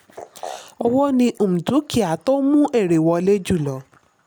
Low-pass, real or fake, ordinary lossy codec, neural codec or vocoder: none; real; none; none